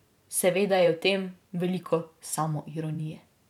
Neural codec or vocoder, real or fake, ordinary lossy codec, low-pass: vocoder, 44.1 kHz, 128 mel bands every 512 samples, BigVGAN v2; fake; none; 19.8 kHz